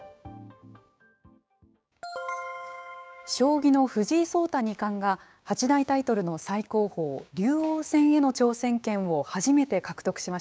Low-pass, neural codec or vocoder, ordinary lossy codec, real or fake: none; codec, 16 kHz, 6 kbps, DAC; none; fake